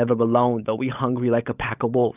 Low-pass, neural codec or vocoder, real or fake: 3.6 kHz; codec, 16 kHz, 16 kbps, FunCodec, trained on LibriTTS, 50 frames a second; fake